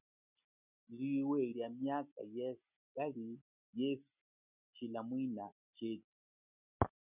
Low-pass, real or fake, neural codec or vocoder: 3.6 kHz; real; none